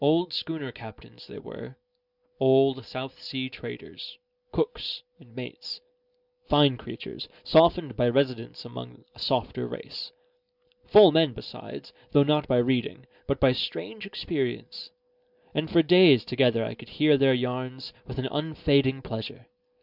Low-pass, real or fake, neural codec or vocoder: 5.4 kHz; real; none